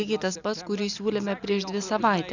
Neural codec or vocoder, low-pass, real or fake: none; 7.2 kHz; real